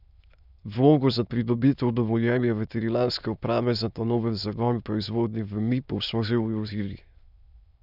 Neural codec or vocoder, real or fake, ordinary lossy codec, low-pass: autoencoder, 22.05 kHz, a latent of 192 numbers a frame, VITS, trained on many speakers; fake; none; 5.4 kHz